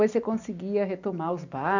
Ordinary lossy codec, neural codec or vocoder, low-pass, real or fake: none; none; 7.2 kHz; real